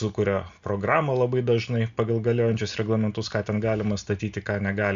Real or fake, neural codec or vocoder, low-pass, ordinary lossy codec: real; none; 7.2 kHz; Opus, 64 kbps